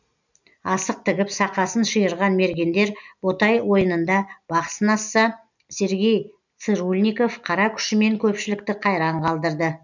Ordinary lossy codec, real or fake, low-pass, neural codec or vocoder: none; real; 7.2 kHz; none